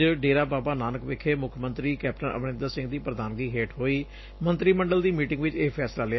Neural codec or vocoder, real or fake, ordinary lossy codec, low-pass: none; real; MP3, 24 kbps; 7.2 kHz